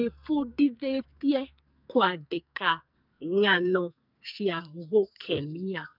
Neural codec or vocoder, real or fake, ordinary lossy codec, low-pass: codec, 44.1 kHz, 2.6 kbps, SNAC; fake; none; 5.4 kHz